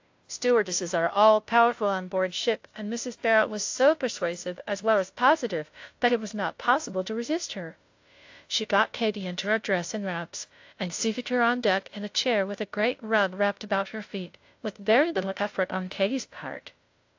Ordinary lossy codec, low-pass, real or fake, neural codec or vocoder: AAC, 48 kbps; 7.2 kHz; fake; codec, 16 kHz, 0.5 kbps, FunCodec, trained on Chinese and English, 25 frames a second